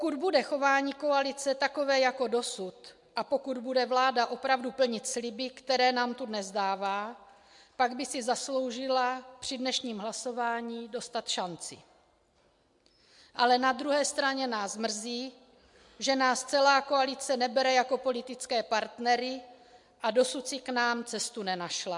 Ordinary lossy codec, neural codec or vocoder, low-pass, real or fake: MP3, 64 kbps; none; 10.8 kHz; real